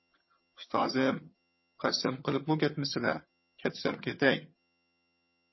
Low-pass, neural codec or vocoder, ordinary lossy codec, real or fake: 7.2 kHz; vocoder, 22.05 kHz, 80 mel bands, HiFi-GAN; MP3, 24 kbps; fake